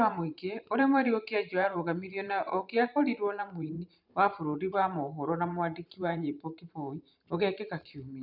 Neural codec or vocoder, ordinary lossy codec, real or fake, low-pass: vocoder, 44.1 kHz, 128 mel bands every 512 samples, BigVGAN v2; none; fake; 5.4 kHz